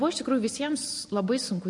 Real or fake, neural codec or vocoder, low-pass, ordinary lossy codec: real; none; 10.8 kHz; MP3, 48 kbps